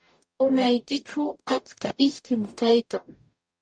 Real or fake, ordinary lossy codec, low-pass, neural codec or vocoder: fake; MP3, 64 kbps; 9.9 kHz; codec, 44.1 kHz, 0.9 kbps, DAC